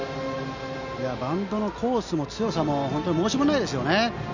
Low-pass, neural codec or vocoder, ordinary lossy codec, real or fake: 7.2 kHz; none; none; real